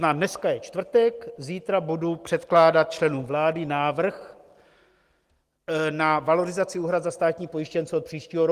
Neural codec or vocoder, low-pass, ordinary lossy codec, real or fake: none; 14.4 kHz; Opus, 24 kbps; real